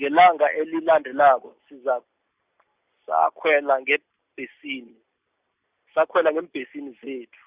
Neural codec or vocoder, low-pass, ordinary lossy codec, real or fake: none; 3.6 kHz; Opus, 64 kbps; real